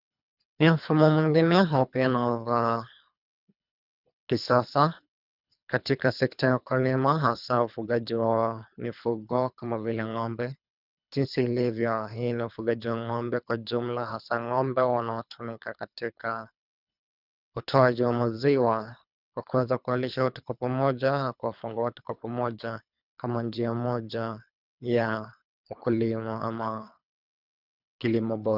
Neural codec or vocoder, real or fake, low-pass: codec, 24 kHz, 3 kbps, HILCodec; fake; 5.4 kHz